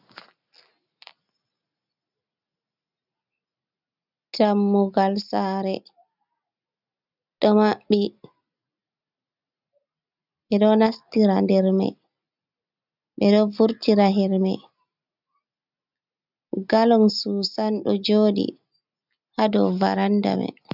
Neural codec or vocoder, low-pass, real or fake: none; 5.4 kHz; real